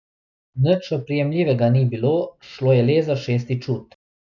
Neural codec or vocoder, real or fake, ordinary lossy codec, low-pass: none; real; none; 7.2 kHz